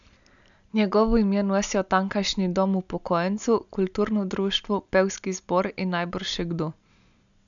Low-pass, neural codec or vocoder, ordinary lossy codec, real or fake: 7.2 kHz; none; none; real